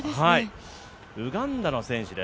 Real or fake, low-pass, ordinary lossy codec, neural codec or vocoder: real; none; none; none